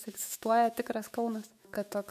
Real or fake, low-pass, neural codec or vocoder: fake; 14.4 kHz; autoencoder, 48 kHz, 128 numbers a frame, DAC-VAE, trained on Japanese speech